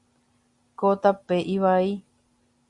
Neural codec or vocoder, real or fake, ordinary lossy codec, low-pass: none; real; Opus, 64 kbps; 10.8 kHz